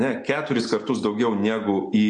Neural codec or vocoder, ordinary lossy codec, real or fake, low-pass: none; MP3, 48 kbps; real; 10.8 kHz